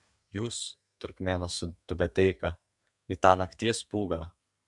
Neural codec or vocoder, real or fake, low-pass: codec, 32 kHz, 1.9 kbps, SNAC; fake; 10.8 kHz